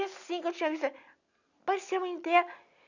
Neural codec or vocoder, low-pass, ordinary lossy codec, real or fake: none; 7.2 kHz; none; real